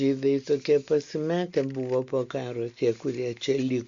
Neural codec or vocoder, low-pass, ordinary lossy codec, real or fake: none; 7.2 kHz; Opus, 64 kbps; real